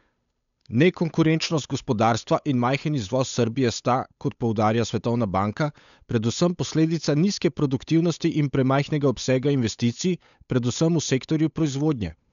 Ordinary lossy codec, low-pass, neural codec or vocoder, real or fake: none; 7.2 kHz; codec, 16 kHz, 8 kbps, FunCodec, trained on Chinese and English, 25 frames a second; fake